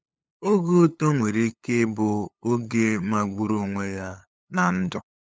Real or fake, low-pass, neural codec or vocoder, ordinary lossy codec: fake; none; codec, 16 kHz, 8 kbps, FunCodec, trained on LibriTTS, 25 frames a second; none